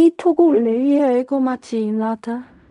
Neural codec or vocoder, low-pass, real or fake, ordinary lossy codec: codec, 16 kHz in and 24 kHz out, 0.4 kbps, LongCat-Audio-Codec, fine tuned four codebook decoder; 10.8 kHz; fake; none